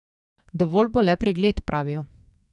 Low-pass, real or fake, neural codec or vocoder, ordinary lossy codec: 10.8 kHz; fake; codec, 44.1 kHz, 2.6 kbps, SNAC; none